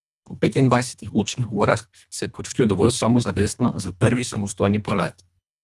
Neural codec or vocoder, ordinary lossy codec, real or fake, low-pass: codec, 24 kHz, 1.5 kbps, HILCodec; none; fake; none